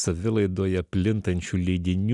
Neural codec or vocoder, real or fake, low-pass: none; real; 10.8 kHz